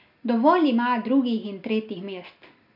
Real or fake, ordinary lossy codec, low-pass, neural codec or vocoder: real; none; 5.4 kHz; none